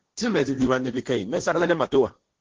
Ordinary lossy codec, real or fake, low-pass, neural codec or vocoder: Opus, 16 kbps; fake; 7.2 kHz; codec, 16 kHz, 1.1 kbps, Voila-Tokenizer